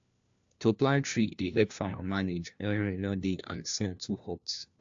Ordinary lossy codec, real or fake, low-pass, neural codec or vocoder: AAC, 64 kbps; fake; 7.2 kHz; codec, 16 kHz, 1 kbps, FunCodec, trained on LibriTTS, 50 frames a second